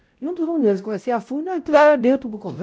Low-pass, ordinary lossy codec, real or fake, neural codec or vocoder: none; none; fake; codec, 16 kHz, 0.5 kbps, X-Codec, WavLM features, trained on Multilingual LibriSpeech